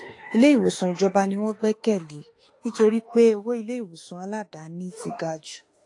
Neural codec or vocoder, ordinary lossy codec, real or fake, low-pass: autoencoder, 48 kHz, 32 numbers a frame, DAC-VAE, trained on Japanese speech; AAC, 48 kbps; fake; 10.8 kHz